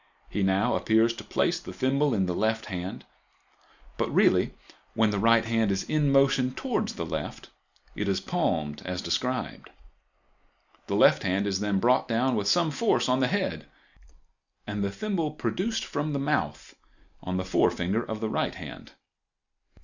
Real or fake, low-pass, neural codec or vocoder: real; 7.2 kHz; none